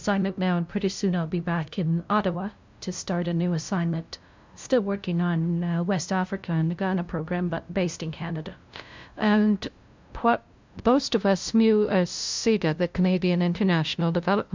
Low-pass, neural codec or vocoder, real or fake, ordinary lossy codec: 7.2 kHz; codec, 16 kHz, 0.5 kbps, FunCodec, trained on LibriTTS, 25 frames a second; fake; MP3, 64 kbps